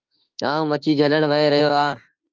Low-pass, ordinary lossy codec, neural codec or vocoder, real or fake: 7.2 kHz; Opus, 24 kbps; autoencoder, 48 kHz, 32 numbers a frame, DAC-VAE, trained on Japanese speech; fake